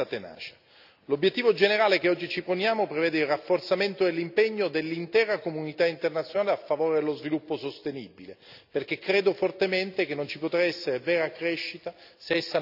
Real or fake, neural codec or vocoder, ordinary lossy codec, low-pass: real; none; none; 5.4 kHz